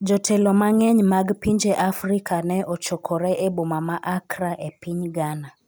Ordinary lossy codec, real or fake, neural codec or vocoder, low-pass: none; real; none; none